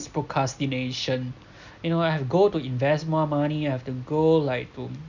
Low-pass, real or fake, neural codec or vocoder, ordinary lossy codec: 7.2 kHz; real; none; none